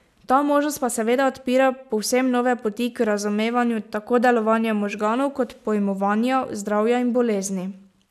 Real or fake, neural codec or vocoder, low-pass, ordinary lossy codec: real; none; 14.4 kHz; none